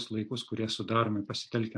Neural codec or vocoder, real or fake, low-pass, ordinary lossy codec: none; real; 9.9 kHz; AAC, 64 kbps